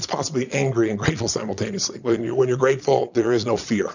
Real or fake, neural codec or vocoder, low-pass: fake; vocoder, 22.05 kHz, 80 mel bands, Vocos; 7.2 kHz